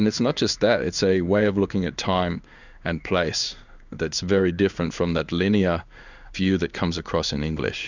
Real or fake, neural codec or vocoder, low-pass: fake; codec, 16 kHz in and 24 kHz out, 1 kbps, XY-Tokenizer; 7.2 kHz